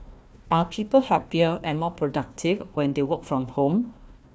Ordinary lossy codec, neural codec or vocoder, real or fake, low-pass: none; codec, 16 kHz, 1 kbps, FunCodec, trained on Chinese and English, 50 frames a second; fake; none